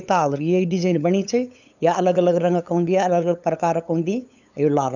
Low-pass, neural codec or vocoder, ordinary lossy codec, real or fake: 7.2 kHz; codec, 16 kHz, 8 kbps, FunCodec, trained on LibriTTS, 25 frames a second; none; fake